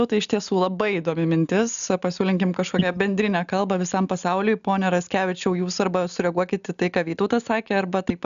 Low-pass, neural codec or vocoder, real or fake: 7.2 kHz; none; real